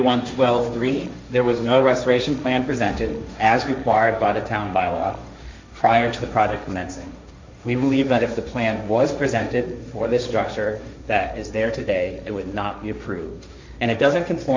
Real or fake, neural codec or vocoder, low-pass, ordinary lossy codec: fake; codec, 16 kHz, 1.1 kbps, Voila-Tokenizer; 7.2 kHz; MP3, 64 kbps